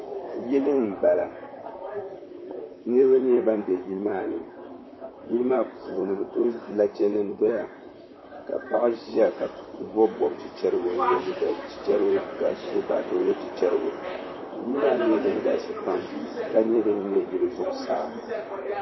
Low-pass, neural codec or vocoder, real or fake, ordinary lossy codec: 7.2 kHz; vocoder, 44.1 kHz, 128 mel bands, Pupu-Vocoder; fake; MP3, 24 kbps